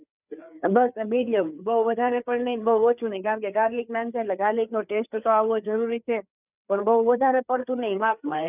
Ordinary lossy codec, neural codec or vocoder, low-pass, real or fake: none; codec, 16 kHz, 4 kbps, FreqCodec, larger model; 3.6 kHz; fake